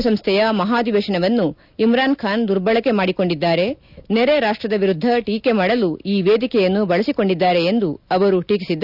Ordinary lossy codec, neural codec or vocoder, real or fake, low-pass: none; none; real; 5.4 kHz